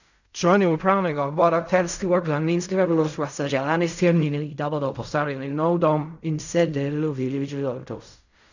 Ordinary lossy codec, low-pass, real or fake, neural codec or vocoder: none; 7.2 kHz; fake; codec, 16 kHz in and 24 kHz out, 0.4 kbps, LongCat-Audio-Codec, fine tuned four codebook decoder